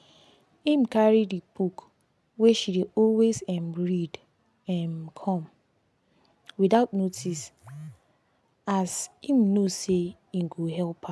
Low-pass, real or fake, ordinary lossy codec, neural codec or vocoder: none; real; none; none